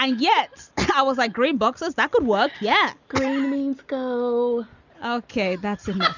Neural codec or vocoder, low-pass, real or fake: none; 7.2 kHz; real